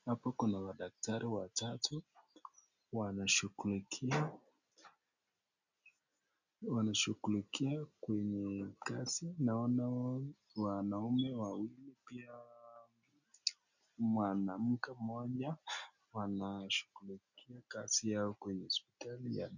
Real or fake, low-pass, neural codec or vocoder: real; 7.2 kHz; none